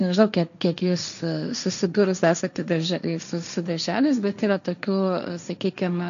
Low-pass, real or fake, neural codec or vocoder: 7.2 kHz; fake; codec, 16 kHz, 1.1 kbps, Voila-Tokenizer